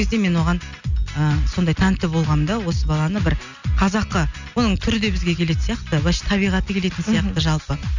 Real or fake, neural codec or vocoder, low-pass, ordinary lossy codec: real; none; 7.2 kHz; none